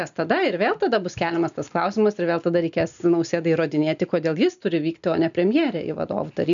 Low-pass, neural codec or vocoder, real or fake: 7.2 kHz; none; real